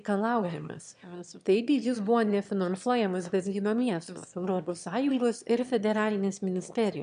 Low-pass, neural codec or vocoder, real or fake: 9.9 kHz; autoencoder, 22.05 kHz, a latent of 192 numbers a frame, VITS, trained on one speaker; fake